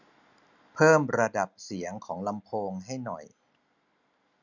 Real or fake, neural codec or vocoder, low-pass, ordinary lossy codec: real; none; 7.2 kHz; none